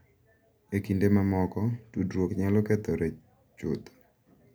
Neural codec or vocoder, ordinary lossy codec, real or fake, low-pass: none; none; real; none